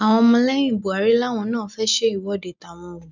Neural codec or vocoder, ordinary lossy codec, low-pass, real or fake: none; none; 7.2 kHz; real